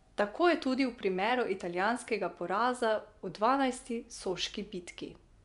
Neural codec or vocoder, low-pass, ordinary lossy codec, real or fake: vocoder, 24 kHz, 100 mel bands, Vocos; 10.8 kHz; none; fake